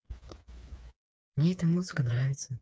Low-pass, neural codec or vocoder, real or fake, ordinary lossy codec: none; codec, 16 kHz, 4 kbps, FreqCodec, smaller model; fake; none